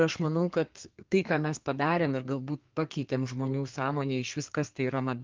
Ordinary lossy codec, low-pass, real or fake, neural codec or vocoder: Opus, 32 kbps; 7.2 kHz; fake; codec, 32 kHz, 1.9 kbps, SNAC